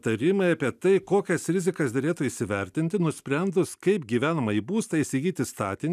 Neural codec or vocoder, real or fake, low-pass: none; real; 14.4 kHz